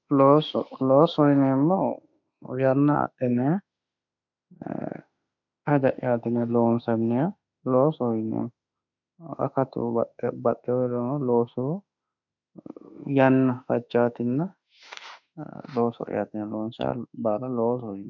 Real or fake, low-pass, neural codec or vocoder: fake; 7.2 kHz; autoencoder, 48 kHz, 32 numbers a frame, DAC-VAE, trained on Japanese speech